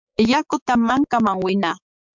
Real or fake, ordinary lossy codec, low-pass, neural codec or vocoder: fake; MP3, 64 kbps; 7.2 kHz; codec, 16 kHz, 6 kbps, DAC